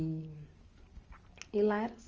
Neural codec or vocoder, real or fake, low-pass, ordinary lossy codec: none; real; 7.2 kHz; Opus, 24 kbps